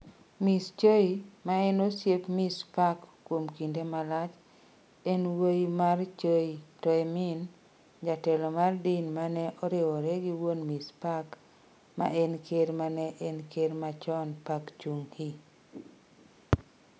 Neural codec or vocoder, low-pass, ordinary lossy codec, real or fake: none; none; none; real